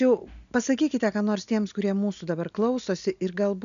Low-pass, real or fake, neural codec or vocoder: 7.2 kHz; real; none